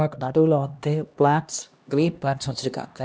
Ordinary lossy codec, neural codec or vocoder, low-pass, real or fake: none; codec, 16 kHz, 1 kbps, X-Codec, HuBERT features, trained on LibriSpeech; none; fake